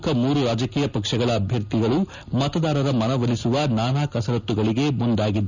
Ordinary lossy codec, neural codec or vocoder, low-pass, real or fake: none; none; 7.2 kHz; real